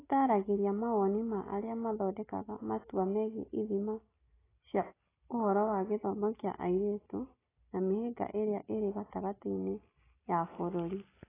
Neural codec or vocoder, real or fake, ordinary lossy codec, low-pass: none; real; AAC, 16 kbps; 3.6 kHz